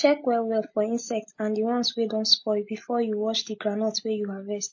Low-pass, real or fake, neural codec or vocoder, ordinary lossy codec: 7.2 kHz; real; none; MP3, 32 kbps